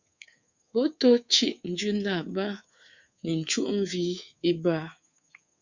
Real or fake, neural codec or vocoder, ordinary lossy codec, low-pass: fake; codec, 24 kHz, 3.1 kbps, DualCodec; Opus, 64 kbps; 7.2 kHz